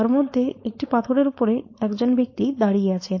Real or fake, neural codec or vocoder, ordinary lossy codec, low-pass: fake; codec, 16 kHz, 4.8 kbps, FACodec; MP3, 32 kbps; 7.2 kHz